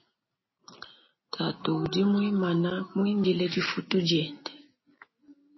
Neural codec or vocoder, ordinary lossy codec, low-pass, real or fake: none; MP3, 24 kbps; 7.2 kHz; real